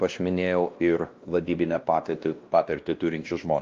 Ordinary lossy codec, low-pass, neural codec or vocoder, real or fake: Opus, 24 kbps; 7.2 kHz; codec, 16 kHz, 1 kbps, X-Codec, WavLM features, trained on Multilingual LibriSpeech; fake